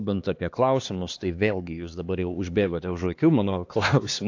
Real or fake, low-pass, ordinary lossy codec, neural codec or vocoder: fake; 7.2 kHz; AAC, 48 kbps; codec, 16 kHz, 2 kbps, X-Codec, HuBERT features, trained on LibriSpeech